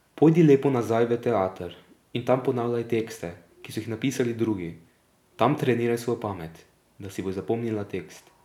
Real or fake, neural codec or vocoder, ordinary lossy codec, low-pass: real; none; none; 19.8 kHz